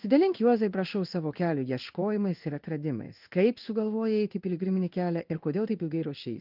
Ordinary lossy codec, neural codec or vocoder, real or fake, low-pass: Opus, 32 kbps; codec, 16 kHz in and 24 kHz out, 1 kbps, XY-Tokenizer; fake; 5.4 kHz